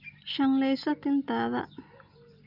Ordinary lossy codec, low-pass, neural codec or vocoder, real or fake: none; 5.4 kHz; none; real